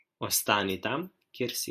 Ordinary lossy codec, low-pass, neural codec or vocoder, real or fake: MP3, 96 kbps; 14.4 kHz; none; real